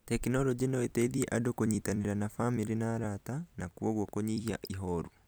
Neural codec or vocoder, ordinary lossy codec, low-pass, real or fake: vocoder, 44.1 kHz, 128 mel bands every 256 samples, BigVGAN v2; none; none; fake